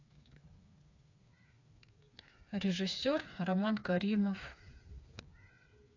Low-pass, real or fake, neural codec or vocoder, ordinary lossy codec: 7.2 kHz; fake; codec, 16 kHz, 4 kbps, FreqCodec, smaller model; AAC, 48 kbps